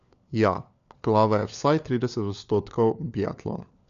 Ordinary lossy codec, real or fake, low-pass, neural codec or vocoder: MP3, 64 kbps; fake; 7.2 kHz; codec, 16 kHz, 4 kbps, FunCodec, trained on LibriTTS, 50 frames a second